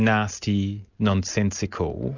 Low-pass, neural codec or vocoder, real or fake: 7.2 kHz; none; real